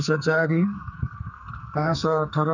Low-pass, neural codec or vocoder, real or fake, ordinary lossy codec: 7.2 kHz; codec, 44.1 kHz, 2.6 kbps, SNAC; fake; none